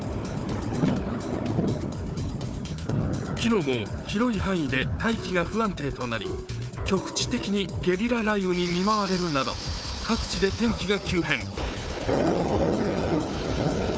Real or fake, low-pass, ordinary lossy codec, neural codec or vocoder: fake; none; none; codec, 16 kHz, 4 kbps, FunCodec, trained on Chinese and English, 50 frames a second